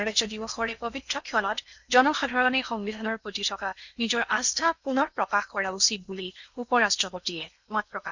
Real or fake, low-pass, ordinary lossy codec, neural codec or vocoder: fake; 7.2 kHz; none; codec, 16 kHz in and 24 kHz out, 0.8 kbps, FocalCodec, streaming, 65536 codes